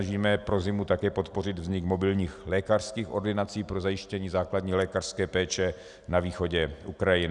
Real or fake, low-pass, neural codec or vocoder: real; 10.8 kHz; none